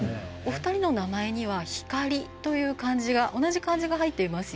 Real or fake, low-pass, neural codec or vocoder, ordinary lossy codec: real; none; none; none